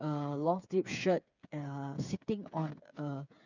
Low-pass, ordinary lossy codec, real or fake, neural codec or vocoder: 7.2 kHz; none; fake; codec, 16 kHz, 8 kbps, FreqCodec, smaller model